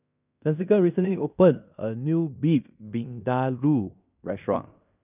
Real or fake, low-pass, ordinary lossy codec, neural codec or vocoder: fake; 3.6 kHz; none; codec, 16 kHz in and 24 kHz out, 0.9 kbps, LongCat-Audio-Codec, fine tuned four codebook decoder